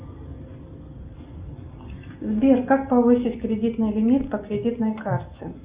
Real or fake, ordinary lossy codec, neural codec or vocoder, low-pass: real; Opus, 64 kbps; none; 3.6 kHz